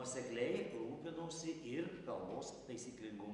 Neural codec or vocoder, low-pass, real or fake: none; 10.8 kHz; real